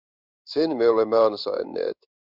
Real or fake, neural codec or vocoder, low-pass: fake; codec, 16 kHz in and 24 kHz out, 1 kbps, XY-Tokenizer; 5.4 kHz